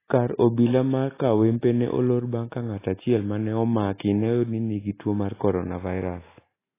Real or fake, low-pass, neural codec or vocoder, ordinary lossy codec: real; 3.6 kHz; none; AAC, 16 kbps